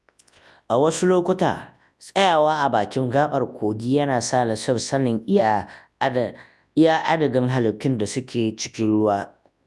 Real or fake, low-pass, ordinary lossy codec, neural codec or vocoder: fake; none; none; codec, 24 kHz, 0.9 kbps, WavTokenizer, large speech release